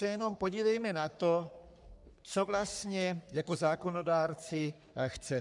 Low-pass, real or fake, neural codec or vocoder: 10.8 kHz; fake; codec, 44.1 kHz, 3.4 kbps, Pupu-Codec